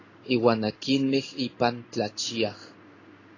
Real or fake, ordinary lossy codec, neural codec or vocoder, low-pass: fake; AAC, 32 kbps; codec, 16 kHz in and 24 kHz out, 1 kbps, XY-Tokenizer; 7.2 kHz